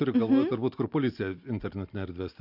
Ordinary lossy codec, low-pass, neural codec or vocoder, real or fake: AAC, 48 kbps; 5.4 kHz; none; real